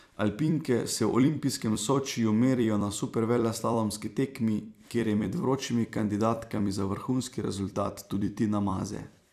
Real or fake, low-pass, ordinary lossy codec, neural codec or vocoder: fake; 14.4 kHz; none; vocoder, 44.1 kHz, 128 mel bands every 256 samples, BigVGAN v2